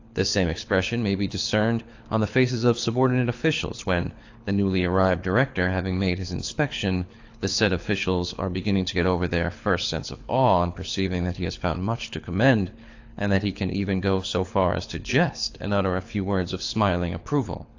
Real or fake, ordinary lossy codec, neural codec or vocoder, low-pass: fake; AAC, 48 kbps; codec, 24 kHz, 6 kbps, HILCodec; 7.2 kHz